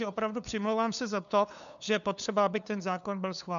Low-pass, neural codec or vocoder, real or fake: 7.2 kHz; codec, 16 kHz, 2 kbps, FunCodec, trained on LibriTTS, 25 frames a second; fake